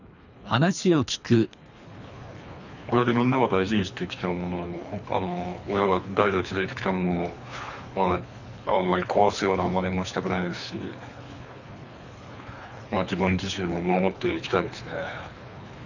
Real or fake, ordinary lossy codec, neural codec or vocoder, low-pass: fake; none; codec, 24 kHz, 3 kbps, HILCodec; 7.2 kHz